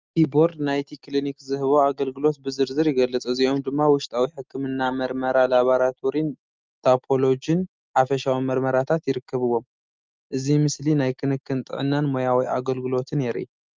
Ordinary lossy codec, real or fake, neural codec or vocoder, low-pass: Opus, 24 kbps; real; none; 7.2 kHz